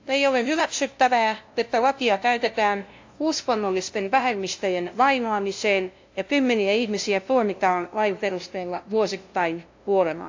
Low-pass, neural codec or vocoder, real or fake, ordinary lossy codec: 7.2 kHz; codec, 16 kHz, 0.5 kbps, FunCodec, trained on LibriTTS, 25 frames a second; fake; AAC, 48 kbps